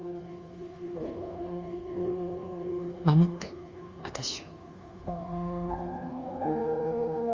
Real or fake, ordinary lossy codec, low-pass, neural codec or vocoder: fake; Opus, 32 kbps; 7.2 kHz; codec, 24 kHz, 1.2 kbps, DualCodec